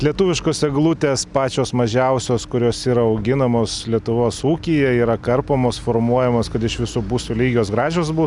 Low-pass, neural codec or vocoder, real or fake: 10.8 kHz; none; real